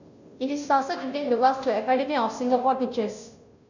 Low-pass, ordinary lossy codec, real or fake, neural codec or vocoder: 7.2 kHz; none; fake; codec, 16 kHz, 0.5 kbps, FunCodec, trained on Chinese and English, 25 frames a second